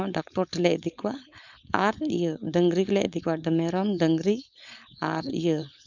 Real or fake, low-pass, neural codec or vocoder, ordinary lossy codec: fake; 7.2 kHz; codec, 16 kHz, 4.8 kbps, FACodec; none